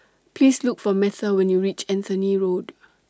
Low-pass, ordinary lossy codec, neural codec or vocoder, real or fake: none; none; none; real